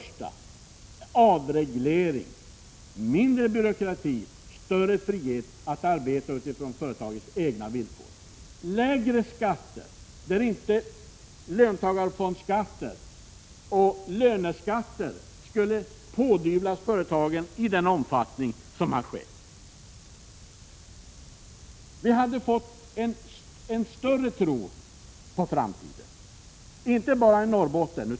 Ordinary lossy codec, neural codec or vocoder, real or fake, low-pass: none; none; real; none